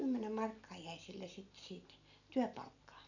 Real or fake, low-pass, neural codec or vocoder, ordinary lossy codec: real; 7.2 kHz; none; none